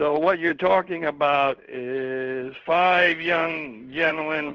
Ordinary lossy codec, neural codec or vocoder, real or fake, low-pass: Opus, 16 kbps; codec, 16 kHz in and 24 kHz out, 1 kbps, XY-Tokenizer; fake; 7.2 kHz